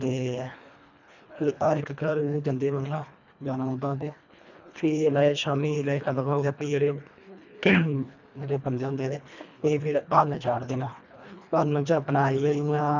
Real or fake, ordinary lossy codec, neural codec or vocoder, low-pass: fake; none; codec, 24 kHz, 1.5 kbps, HILCodec; 7.2 kHz